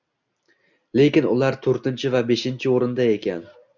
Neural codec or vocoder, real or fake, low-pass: none; real; 7.2 kHz